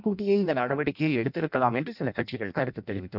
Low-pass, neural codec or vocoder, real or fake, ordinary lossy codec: 5.4 kHz; codec, 16 kHz in and 24 kHz out, 0.6 kbps, FireRedTTS-2 codec; fake; none